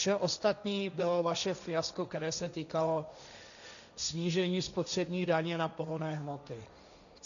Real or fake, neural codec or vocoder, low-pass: fake; codec, 16 kHz, 1.1 kbps, Voila-Tokenizer; 7.2 kHz